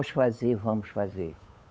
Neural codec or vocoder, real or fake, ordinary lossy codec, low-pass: codec, 16 kHz, 8 kbps, FunCodec, trained on Chinese and English, 25 frames a second; fake; none; none